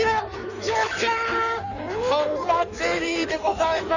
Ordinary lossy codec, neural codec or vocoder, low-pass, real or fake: none; codec, 16 kHz in and 24 kHz out, 1.1 kbps, FireRedTTS-2 codec; 7.2 kHz; fake